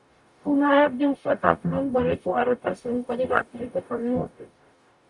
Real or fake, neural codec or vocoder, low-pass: fake; codec, 44.1 kHz, 0.9 kbps, DAC; 10.8 kHz